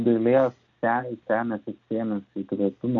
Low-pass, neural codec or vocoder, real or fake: 7.2 kHz; none; real